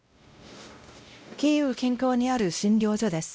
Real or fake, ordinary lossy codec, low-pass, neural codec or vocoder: fake; none; none; codec, 16 kHz, 0.5 kbps, X-Codec, WavLM features, trained on Multilingual LibriSpeech